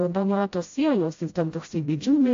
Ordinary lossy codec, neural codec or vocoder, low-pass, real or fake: AAC, 48 kbps; codec, 16 kHz, 0.5 kbps, FreqCodec, smaller model; 7.2 kHz; fake